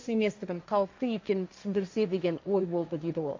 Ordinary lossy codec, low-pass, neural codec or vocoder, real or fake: AAC, 48 kbps; 7.2 kHz; codec, 16 kHz, 1.1 kbps, Voila-Tokenizer; fake